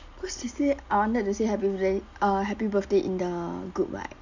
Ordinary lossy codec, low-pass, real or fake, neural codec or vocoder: none; 7.2 kHz; real; none